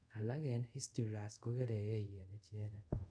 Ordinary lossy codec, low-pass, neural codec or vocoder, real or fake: none; 9.9 kHz; codec, 24 kHz, 0.5 kbps, DualCodec; fake